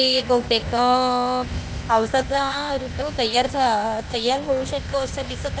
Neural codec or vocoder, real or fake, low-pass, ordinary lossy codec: codec, 16 kHz, 0.8 kbps, ZipCodec; fake; none; none